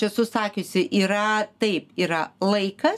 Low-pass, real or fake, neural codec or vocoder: 14.4 kHz; real; none